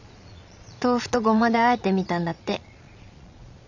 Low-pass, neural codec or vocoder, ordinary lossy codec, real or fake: 7.2 kHz; vocoder, 22.05 kHz, 80 mel bands, Vocos; none; fake